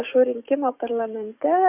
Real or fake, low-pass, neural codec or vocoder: fake; 3.6 kHz; codec, 44.1 kHz, 7.8 kbps, DAC